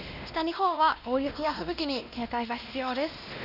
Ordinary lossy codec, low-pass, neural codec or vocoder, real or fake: none; 5.4 kHz; codec, 16 kHz, 1 kbps, X-Codec, WavLM features, trained on Multilingual LibriSpeech; fake